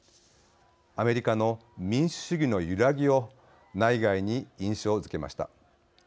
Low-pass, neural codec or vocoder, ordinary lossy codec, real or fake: none; none; none; real